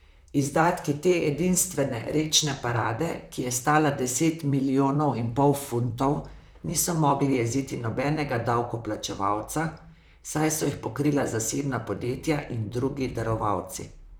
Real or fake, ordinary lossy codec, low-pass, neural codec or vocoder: fake; none; none; vocoder, 44.1 kHz, 128 mel bands, Pupu-Vocoder